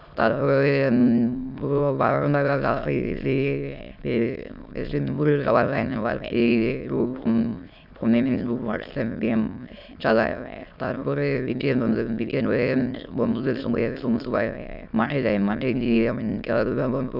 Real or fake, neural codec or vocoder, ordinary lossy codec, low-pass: fake; autoencoder, 22.05 kHz, a latent of 192 numbers a frame, VITS, trained on many speakers; none; 5.4 kHz